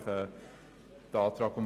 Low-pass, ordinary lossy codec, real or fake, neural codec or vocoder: 14.4 kHz; Opus, 32 kbps; real; none